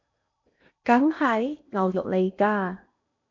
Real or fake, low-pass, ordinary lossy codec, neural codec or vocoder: fake; 7.2 kHz; AAC, 48 kbps; codec, 16 kHz in and 24 kHz out, 0.8 kbps, FocalCodec, streaming, 65536 codes